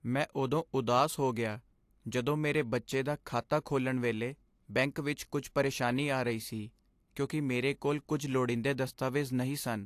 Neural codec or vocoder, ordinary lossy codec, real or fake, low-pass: none; AAC, 64 kbps; real; 14.4 kHz